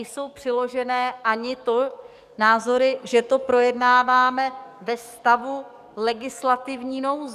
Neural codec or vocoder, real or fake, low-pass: codec, 44.1 kHz, 7.8 kbps, DAC; fake; 14.4 kHz